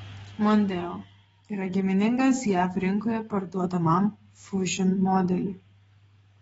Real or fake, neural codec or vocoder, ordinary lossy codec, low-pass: fake; codec, 44.1 kHz, 7.8 kbps, DAC; AAC, 24 kbps; 19.8 kHz